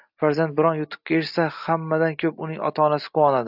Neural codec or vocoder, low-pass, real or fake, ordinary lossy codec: none; 5.4 kHz; real; AAC, 48 kbps